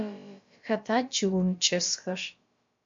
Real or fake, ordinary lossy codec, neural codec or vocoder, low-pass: fake; MP3, 48 kbps; codec, 16 kHz, about 1 kbps, DyCAST, with the encoder's durations; 7.2 kHz